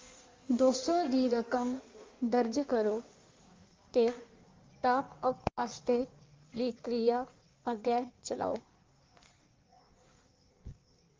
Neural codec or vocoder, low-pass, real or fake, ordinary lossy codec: codec, 16 kHz in and 24 kHz out, 1.1 kbps, FireRedTTS-2 codec; 7.2 kHz; fake; Opus, 32 kbps